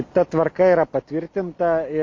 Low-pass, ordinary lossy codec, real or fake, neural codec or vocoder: 7.2 kHz; MP3, 64 kbps; real; none